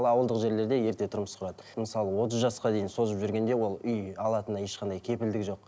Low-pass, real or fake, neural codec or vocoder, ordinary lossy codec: none; real; none; none